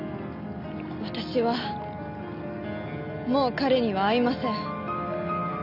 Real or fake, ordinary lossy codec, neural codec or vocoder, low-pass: real; none; none; 5.4 kHz